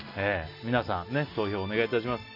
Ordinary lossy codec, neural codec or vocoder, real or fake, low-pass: none; none; real; 5.4 kHz